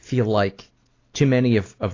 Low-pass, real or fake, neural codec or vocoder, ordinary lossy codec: 7.2 kHz; real; none; AAC, 32 kbps